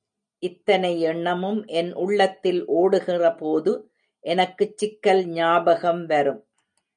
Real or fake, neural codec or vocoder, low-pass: real; none; 9.9 kHz